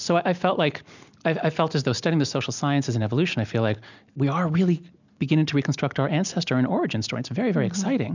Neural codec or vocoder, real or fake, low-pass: none; real; 7.2 kHz